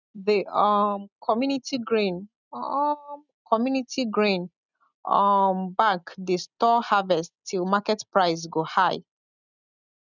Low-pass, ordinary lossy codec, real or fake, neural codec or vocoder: 7.2 kHz; none; real; none